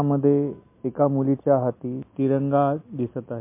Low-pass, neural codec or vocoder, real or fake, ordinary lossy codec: 3.6 kHz; none; real; MP3, 24 kbps